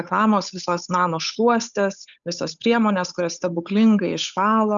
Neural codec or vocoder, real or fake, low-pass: codec, 16 kHz, 8 kbps, FunCodec, trained on Chinese and English, 25 frames a second; fake; 7.2 kHz